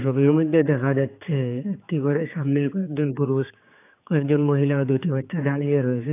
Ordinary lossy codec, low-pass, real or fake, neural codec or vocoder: AAC, 24 kbps; 3.6 kHz; fake; codec, 16 kHz, 4 kbps, X-Codec, HuBERT features, trained on general audio